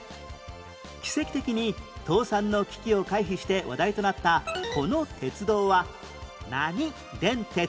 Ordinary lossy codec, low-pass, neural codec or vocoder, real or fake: none; none; none; real